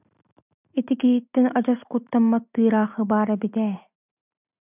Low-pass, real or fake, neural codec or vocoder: 3.6 kHz; real; none